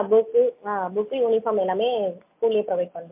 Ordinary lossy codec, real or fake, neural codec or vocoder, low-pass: MP3, 32 kbps; real; none; 3.6 kHz